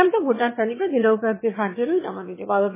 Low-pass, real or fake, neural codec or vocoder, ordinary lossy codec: 3.6 kHz; fake; autoencoder, 22.05 kHz, a latent of 192 numbers a frame, VITS, trained on one speaker; MP3, 16 kbps